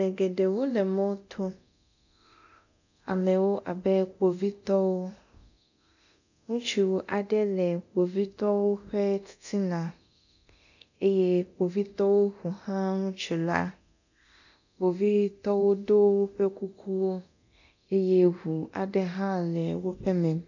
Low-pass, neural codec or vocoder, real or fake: 7.2 kHz; codec, 24 kHz, 0.9 kbps, DualCodec; fake